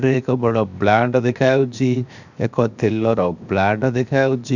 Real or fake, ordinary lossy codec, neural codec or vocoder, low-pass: fake; none; codec, 16 kHz, 0.7 kbps, FocalCodec; 7.2 kHz